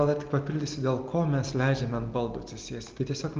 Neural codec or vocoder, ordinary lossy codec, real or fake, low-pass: none; Opus, 32 kbps; real; 7.2 kHz